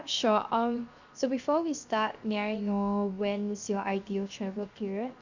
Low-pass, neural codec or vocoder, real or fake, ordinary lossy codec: 7.2 kHz; codec, 16 kHz, 0.7 kbps, FocalCodec; fake; Opus, 64 kbps